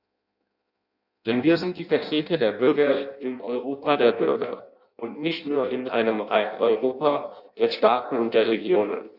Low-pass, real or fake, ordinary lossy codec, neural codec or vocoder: 5.4 kHz; fake; none; codec, 16 kHz in and 24 kHz out, 0.6 kbps, FireRedTTS-2 codec